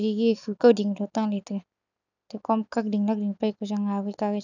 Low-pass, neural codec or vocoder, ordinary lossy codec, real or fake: 7.2 kHz; none; none; real